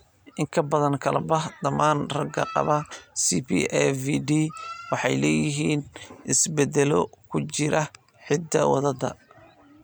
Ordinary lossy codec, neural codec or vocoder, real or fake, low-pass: none; none; real; none